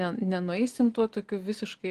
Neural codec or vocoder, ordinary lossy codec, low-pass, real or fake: none; Opus, 24 kbps; 14.4 kHz; real